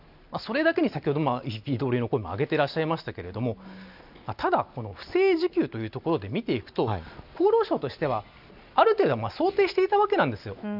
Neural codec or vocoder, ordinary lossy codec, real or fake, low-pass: none; none; real; 5.4 kHz